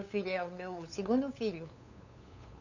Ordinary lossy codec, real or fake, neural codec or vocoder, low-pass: none; fake; codec, 16 kHz, 8 kbps, FunCodec, trained on LibriTTS, 25 frames a second; 7.2 kHz